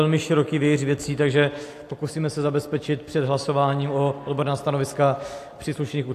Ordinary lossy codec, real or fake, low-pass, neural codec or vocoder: AAC, 64 kbps; real; 14.4 kHz; none